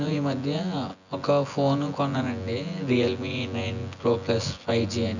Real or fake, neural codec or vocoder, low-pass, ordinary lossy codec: fake; vocoder, 24 kHz, 100 mel bands, Vocos; 7.2 kHz; none